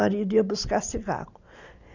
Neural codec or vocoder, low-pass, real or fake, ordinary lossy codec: none; 7.2 kHz; real; none